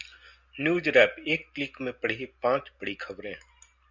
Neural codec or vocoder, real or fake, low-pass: none; real; 7.2 kHz